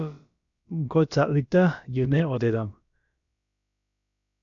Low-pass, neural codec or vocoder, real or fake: 7.2 kHz; codec, 16 kHz, about 1 kbps, DyCAST, with the encoder's durations; fake